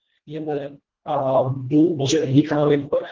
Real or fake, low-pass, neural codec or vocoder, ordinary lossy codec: fake; 7.2 kHz; codec, 24 kHz, 1.5 kbps, HILCodec; Opus, 16 kbps